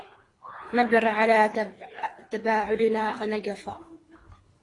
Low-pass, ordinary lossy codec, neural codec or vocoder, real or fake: 10.8 kHz; AAC, 32 kbps; codec, 24 kHz, 3 kbps, HILCodec; fake